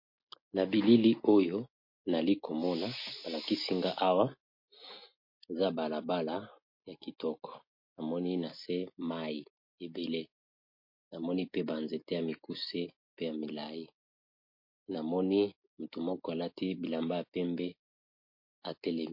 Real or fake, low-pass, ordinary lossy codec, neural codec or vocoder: real; 5.4 kHz; MP3, 32 kbps; none